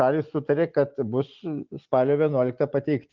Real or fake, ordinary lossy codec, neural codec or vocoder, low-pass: real; Opus, 24 kbps; none; 7.2 kHz